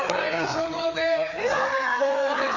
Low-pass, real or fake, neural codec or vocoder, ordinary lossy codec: 7.2 kHz; fake; codec, 16 kHz, 4 kbps, FreqCodec, larger model; none